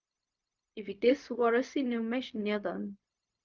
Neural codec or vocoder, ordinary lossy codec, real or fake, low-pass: codec, 16 kHz, 0.4 kbps, LongCat-Audio-Codec; Opus, 24 kbps; fake; 7.2 kHz